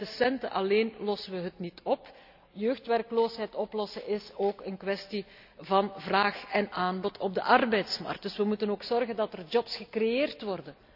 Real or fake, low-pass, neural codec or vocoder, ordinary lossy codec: real; 5.4 kHz; none; none